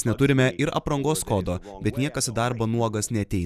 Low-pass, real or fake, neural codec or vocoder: 14.4 kHz; fake; vocoder, 44.1 kHz, 128 mel bands every 256 samples, BigVGAN v2